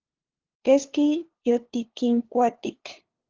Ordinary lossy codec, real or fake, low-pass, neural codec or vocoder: Opus, 16 kbps; fake; 7.2 kHz; codec, 16 kHz, 2 kbps, FunCodec, trained on LibriTTS, 25 frames a second